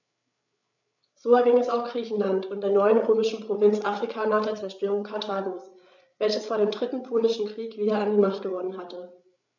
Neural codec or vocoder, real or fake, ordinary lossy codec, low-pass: codec, 16 kHz, 8 kbps, FreqCodec, larger model; fake; none; 7.2 kHz